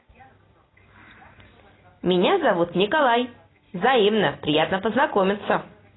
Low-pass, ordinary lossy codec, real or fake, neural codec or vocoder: 7.2 kHz; AAC, 16 kbps; real; none